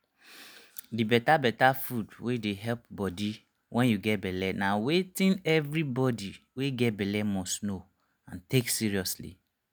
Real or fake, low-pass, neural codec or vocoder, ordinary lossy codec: real; none; none; none